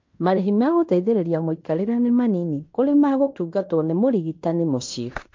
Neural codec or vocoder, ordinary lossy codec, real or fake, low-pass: codec, 16 kHz in and 24 kHz out, 0.9 kbps, LongCat-Audio-Codec, fine tuned four codebook decoder; MP3, 48 kbps; fake; 7.2 kHz